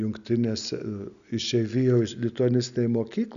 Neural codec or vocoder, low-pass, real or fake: none; 7.2 kHz; real